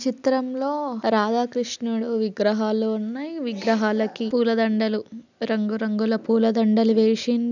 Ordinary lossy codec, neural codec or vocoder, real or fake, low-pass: none; none; real; 7.2 kHz